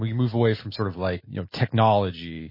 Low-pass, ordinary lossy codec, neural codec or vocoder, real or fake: 5.4 kHz; MP3, 24 kbps; none; real